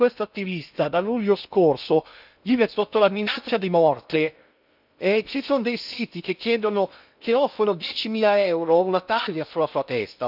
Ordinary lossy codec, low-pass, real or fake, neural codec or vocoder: none; 5.4 kHz; fake; codec, 16 kHz in and 24 kHz out, 0.6 kbps, FocalCodec, streaming, 2048 codes